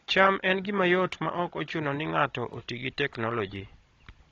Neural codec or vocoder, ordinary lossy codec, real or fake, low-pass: codec, 16 kHz, 16 kbps, FunCodec, trained on Chinese and English, 50 frames a second; AAC, 32 kbps; fake; 7.2 kHz